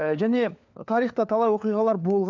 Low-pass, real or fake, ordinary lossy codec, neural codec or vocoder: 7.2 kHz; fake; none; codec, 16 kHz, 4 kbps, FunCodec, trained on LibriTTS, 50 frames a second